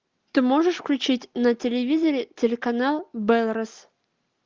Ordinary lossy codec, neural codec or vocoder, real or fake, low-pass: Opus, 24 kbps; none; real; 7.2 kHz